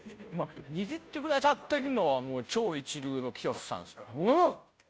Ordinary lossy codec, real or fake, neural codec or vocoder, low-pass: none; fake; codec, 16 kHz, 0.5 kbps, FunCodec, trained on Chinese and English, 25 frames a second; none